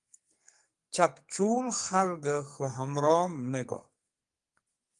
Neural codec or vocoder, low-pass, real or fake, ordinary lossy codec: codec, 44.1 kHz, 2.6 kbps, SNAC; 10.8 kHz; fake; Opus, 32 kbps